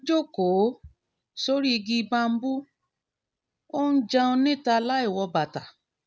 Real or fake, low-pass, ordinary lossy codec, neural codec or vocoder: real; none; none; none